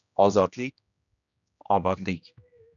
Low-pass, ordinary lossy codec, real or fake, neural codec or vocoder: 7.2 kHz; MP3, 96 kbps; fake; codec, 16 kHz, 1 kbps, X-Codec, HuBERT features, trained on general audio